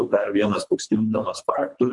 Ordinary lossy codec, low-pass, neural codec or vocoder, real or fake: MP3, 64 kbps; 10.8 kHz; codec, 24 kHz, 3 kbps, HILCodec; fake